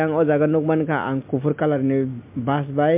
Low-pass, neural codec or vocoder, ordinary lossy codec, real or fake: 3.6 kHz; none; MP3, 32 kbps; real